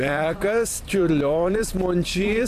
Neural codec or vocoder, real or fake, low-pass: vocoder, 44.1 kHz, 128 mel bands every 512 samples, BigVGAN v2; fake; 14.4 kHz